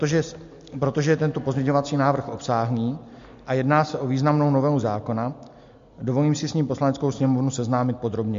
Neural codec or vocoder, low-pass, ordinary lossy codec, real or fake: none; 7.2 kHz; MP3, 48 kbps; real